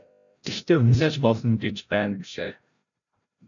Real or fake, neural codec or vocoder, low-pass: fake; codec, 16 kHz, 0.5 kbps, FreqCodec, larger model; 7.2 kHz